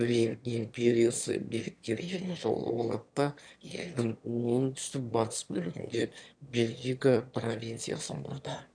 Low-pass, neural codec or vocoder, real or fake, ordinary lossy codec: 9.9 kHz; autoencoder, 22.05 kHz, a latent of 192 numbers a frame, VITS, trained on one speaker; fake; none